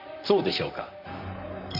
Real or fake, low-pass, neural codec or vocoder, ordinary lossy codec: fake; 5.4 kHz; vocoder, 44.1 kHz, 128 mel bands, Pupu-Vocoder; none